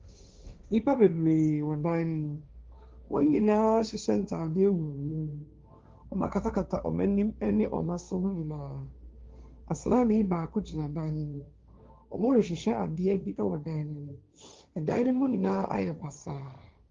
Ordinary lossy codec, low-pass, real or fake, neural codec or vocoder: Opus, 32 kbps; 7.2 kHz; fake; codec, 16 kHz, 1.1 kbps, Voila-Tokenizer